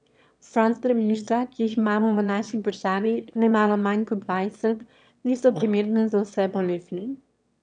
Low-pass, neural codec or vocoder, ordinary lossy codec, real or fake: 9.9 kHz; autoencoder, 22.05 kHz, a latent of 192 numbers a frame, VITS, trained on one speaker; none; fake